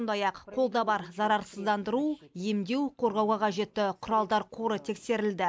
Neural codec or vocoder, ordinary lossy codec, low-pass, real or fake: none; none; none; real